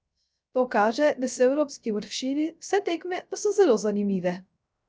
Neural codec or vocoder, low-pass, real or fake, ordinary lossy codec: codec, 16 kHz, 0.3 kbps, FocalCodec; none; fake; none